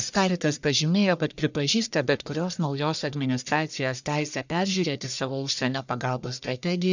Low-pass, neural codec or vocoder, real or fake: 7.2 kHz; codec, 44.1 kHz, 1.7 kbps, Pupu-Codec; fake